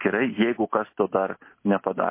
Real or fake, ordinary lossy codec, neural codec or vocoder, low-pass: real; MP3, 24 kbps; none; 3.6 kHz